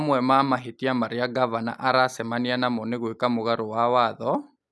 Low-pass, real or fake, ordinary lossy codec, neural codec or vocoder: none; real; none; none